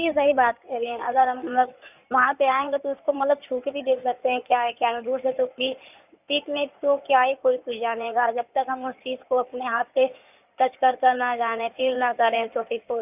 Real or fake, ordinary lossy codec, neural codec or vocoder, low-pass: fake; none; codec, 16 kHz in and 24 kHz out, 2.2 kbps, FireRedTTS-2 codec; 3.6 kHz